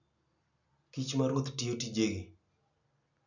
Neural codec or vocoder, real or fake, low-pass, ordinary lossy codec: none; real; 7.2 kHz; none